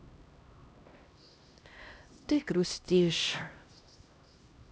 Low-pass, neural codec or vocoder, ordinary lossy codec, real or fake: none; codec, 16 kHz, 1 kbps, X-Codec, HuBERT features, trained on LibriSpeech; none; fake